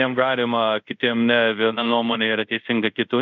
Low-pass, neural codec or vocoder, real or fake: 7.2 kHz; codec, 24 kHz, 0.5 kbps, DualCodec; fake